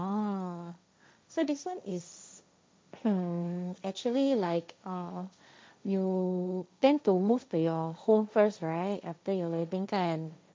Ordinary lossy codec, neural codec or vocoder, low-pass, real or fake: none; codec, 16 kHz, 1.1 kbps, Voila-Tokenizer; none; fake